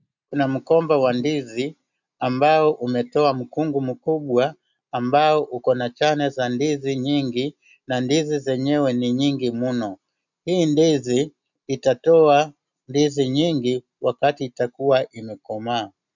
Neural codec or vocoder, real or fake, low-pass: none; real; 7.2 kHz